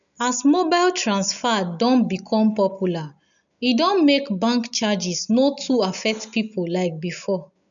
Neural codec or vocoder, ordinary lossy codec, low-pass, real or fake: none; none; 7.2 kHz; real